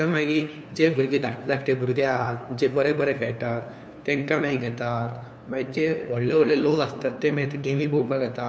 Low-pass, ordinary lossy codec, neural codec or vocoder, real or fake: none; none; codec, 16 kHz, 2 kbps, FunCodec, trained on LibriTTS, 25 frames a second; fake